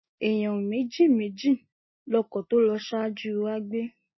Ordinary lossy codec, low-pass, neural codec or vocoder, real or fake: MP3, 24 kbps; 7.2 kHz; none; real